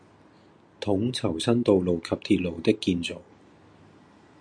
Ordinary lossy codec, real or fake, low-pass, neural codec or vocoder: MP3, 64 kbps; real; 9.9 kHz; none